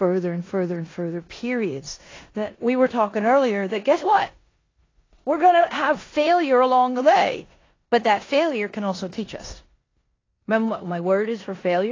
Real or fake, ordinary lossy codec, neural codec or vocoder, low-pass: fake; AAC, 32 kbps; codec, 16 kHz in and 24 kHz out, 0.9 kbps, LongCat-Audio-Codec, four codebook decoder; 7.2 kHz